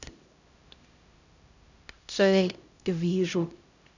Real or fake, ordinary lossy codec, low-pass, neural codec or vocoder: fake; none; 7.2 kHz; codec, 16 kHz, 0.5 kbps, X-Codec, HuBERT features, trained on LibriSpeech